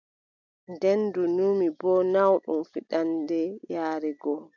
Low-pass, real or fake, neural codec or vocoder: 7.2 kHz; real; none